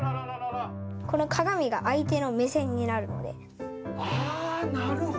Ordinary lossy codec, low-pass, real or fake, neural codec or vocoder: none; none; real; none